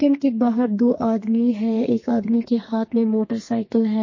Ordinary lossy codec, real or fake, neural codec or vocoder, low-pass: MP3, 32 kbps; fake; codec, 32 kHz, 1.9 kbps, SNAC; 7.2 kHz